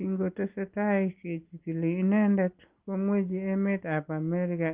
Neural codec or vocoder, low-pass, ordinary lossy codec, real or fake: none; 3.6 kHz; Opus, 32 kbps; real